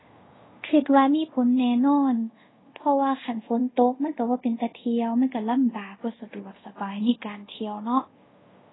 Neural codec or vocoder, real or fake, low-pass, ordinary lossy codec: codec, 24 kHz, 0.5 kbps, DualCodec; fake; 7.2 kHz; AAC, 16 kbps